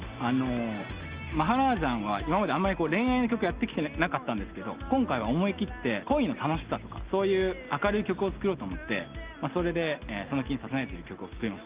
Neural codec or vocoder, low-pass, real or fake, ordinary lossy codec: none; 3.6 kHz; real; Opus, 32 kbps